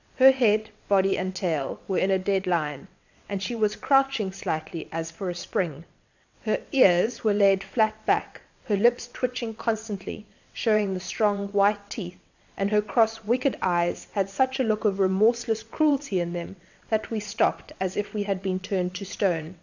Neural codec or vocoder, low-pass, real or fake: vocoder, 22.05 kHz, 80 mel bands, WaveNeXt; 7.2 kHz; fake